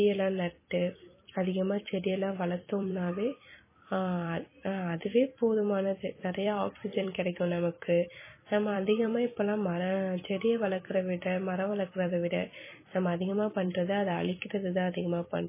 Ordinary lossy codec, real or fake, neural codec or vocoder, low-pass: MP3, 16 kbps; fake; vocoder, 44.1 kHz, 128 mel bands every 256 samples, BigVGAN v2; 3.6 kHz